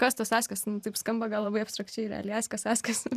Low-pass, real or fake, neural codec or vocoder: 14.4 kHz; fake; vocoder, 44.1 kHz, 128 mel bands every 512 samples, BigVGAN v2